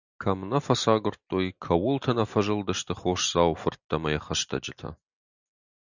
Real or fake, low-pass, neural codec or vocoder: real; 7.2 kHz; none